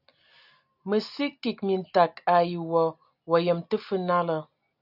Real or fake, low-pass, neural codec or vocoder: real; 5.4 kHz; none